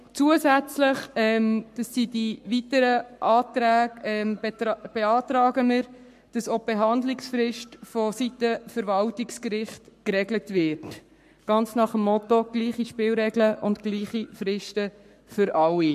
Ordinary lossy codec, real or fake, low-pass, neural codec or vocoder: MP3, 64 kbps; fake; 14.4 kHz; codec, 44.1 kHz, 7.8 kbps, Pupu-Codec